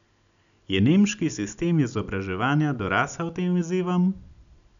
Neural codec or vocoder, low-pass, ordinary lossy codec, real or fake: none; 7.2 kHz; none; real